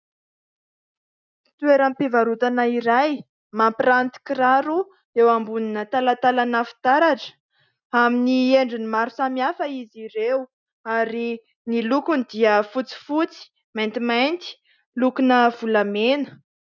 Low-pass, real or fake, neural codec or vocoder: 7.2 kHz; real; none